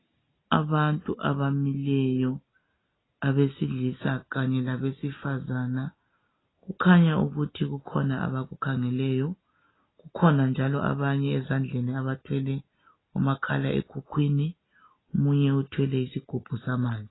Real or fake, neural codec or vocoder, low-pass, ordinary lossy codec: real; none; 7.2 kHz; AAC, 16 kbps